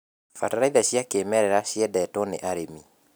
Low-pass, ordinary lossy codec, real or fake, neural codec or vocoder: none; none; real; none